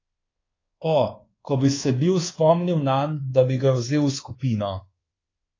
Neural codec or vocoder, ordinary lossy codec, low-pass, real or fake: codec, 24 kHz, 1.2 kbps, DualCodec; AAC, 32 kbps; 7.2 kHz; fake